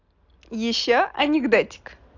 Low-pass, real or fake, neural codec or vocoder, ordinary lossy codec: 7.2 kHz; real; none; AAC, 48 kbps